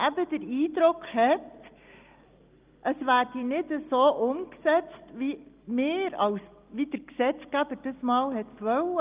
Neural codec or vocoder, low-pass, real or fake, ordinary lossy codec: none; 3.6 kHz; real; Opus, 32 kbps